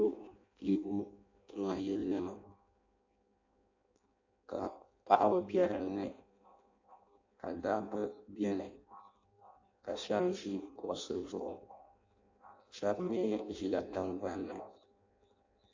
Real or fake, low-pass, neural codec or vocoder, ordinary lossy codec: fake; 7.2 kHz; codec, 16 kHz in and 24 kHz out, 0.6 kbps, FireRedTTS-2 codec; AAC, 48 kbps